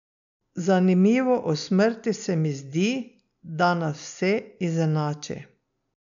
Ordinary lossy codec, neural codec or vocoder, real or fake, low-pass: none; none; real; 7.2 kHz